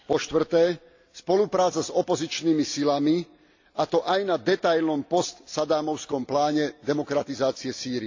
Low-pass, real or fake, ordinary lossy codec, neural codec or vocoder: 7.2 kHz; real; AAC, 48 kbps; none